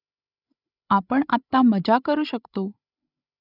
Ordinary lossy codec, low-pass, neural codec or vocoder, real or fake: none; 5.4 kHz; codec, 16 kHz, 16 kbps, FreqCodec, larger model; fake